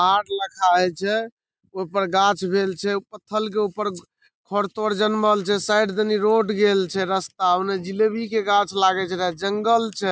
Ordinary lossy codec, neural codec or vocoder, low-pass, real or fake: none; none; none; real